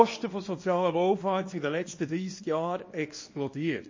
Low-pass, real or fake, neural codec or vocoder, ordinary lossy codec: 7.2 kHz; fake; codec, 24 kHz, 0.9 kbps, WavTokenizer, small release; MP3, 32 kbps